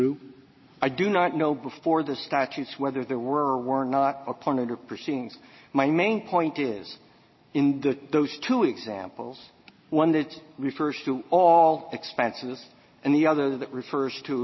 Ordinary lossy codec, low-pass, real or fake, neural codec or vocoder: MP3, 24 kbps; 7.2 kHz; real; none